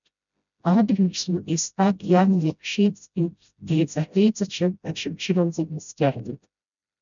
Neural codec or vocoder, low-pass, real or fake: codec, 16 kHz, 0.5 kbps, FreqCodec, smaller model; 7.2 kHz; fake